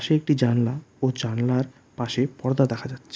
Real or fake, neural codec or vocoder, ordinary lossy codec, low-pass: real; none; none; none